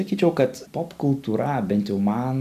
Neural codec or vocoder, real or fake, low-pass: none; real; 14.4 kHz